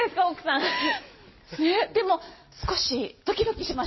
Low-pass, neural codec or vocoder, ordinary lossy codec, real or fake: 7.2 kHz; none; MP3, 24 kbps; real